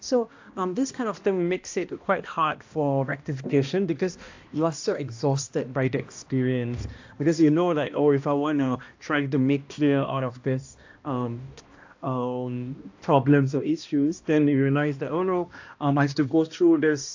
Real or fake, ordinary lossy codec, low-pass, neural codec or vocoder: fake; AAC, 48 kbps; 7.2 kHz; codec, 16 kHz, 1 kbps, X-Codec, HuBERT features, trained on balanced general audio